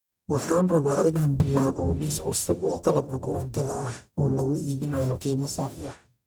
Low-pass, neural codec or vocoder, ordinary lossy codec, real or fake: none; codec, 44.1 kHz, 0.9 kbps, DAC; none; fake